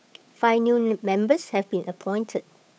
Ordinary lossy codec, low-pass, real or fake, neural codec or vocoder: none; none; fake; codec, 16 kHz, 8 kbps, FunCodec, trained on Chinese and English, 25 frames a second